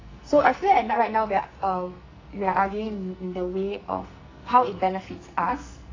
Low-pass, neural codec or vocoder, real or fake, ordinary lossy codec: 7.2 kHz; codec, 44.1 kHz, 2.6 kbps, SNAC; fake; AAC, 32 kbps